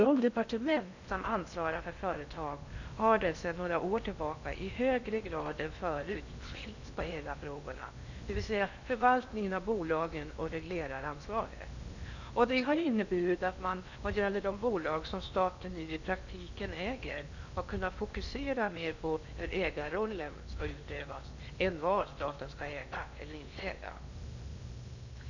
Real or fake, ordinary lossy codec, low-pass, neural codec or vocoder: fake; none; 7.2 kHz; codec, 16 kHz in and 24 kHz out, 0.8 kbps, FocalCodec, streaming, 65536 codes